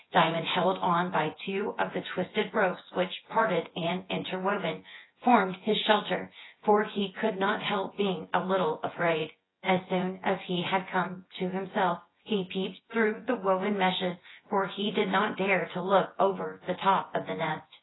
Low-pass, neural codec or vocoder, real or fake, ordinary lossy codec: 7.2 kHz; vocoder, 24 kHz, 100 mel bands, Vocos; fake; AAC, 16 kbps